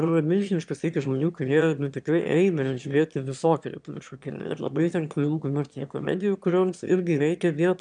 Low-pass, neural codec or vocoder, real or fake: 9.9 kHz; autoencoder, 22.05 kHz, a latent of 192 numbers a frame, VITS, trained on one speaker; fake